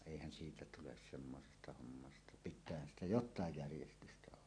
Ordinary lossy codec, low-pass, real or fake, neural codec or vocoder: AAC, 48 kbps; 9.9 kHz; real; none